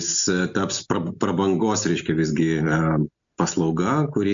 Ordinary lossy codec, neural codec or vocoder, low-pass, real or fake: MP3, 64 kbps; none; 7.2 kHz; real